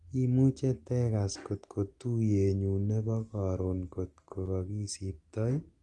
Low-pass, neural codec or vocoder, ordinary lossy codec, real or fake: 10.8 kHz; none; Opus, 24 kbps; real